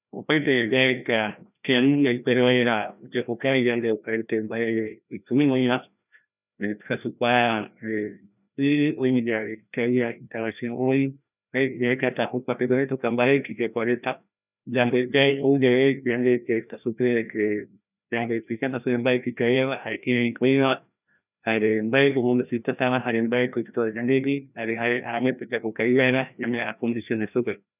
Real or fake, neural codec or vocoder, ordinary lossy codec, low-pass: fake; codec, 16 kHz, 1 kbps, FreqCodec, larger model; none; 3.6 kHz